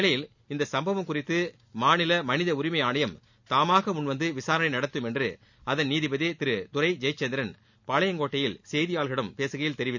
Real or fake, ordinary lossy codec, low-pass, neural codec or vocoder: real; none; 7.2 kHz; none